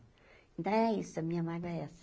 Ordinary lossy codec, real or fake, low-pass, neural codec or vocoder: none; real; none; none